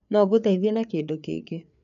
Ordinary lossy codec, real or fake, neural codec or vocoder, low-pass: none; fake; codec, 16 kHz, 4 kbps, FreqCodec, larger model; 7.2 kHz